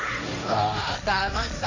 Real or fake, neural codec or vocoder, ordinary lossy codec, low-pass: fake; codec, 16 kHz, 1.1 kbps, Voila-Tokenizer; none; 7.2 kHz